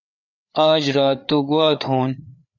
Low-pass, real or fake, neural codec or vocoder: 7.2 kHz; fake; codec, 16 kHz, 4 kbps, FreqCodec, larger model